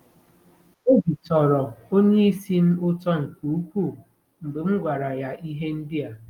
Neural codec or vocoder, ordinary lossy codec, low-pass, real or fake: none; Opus, 32 kbps; 19.8 kHz; real